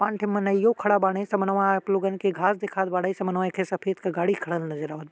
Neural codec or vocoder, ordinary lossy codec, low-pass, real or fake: none; none; none; real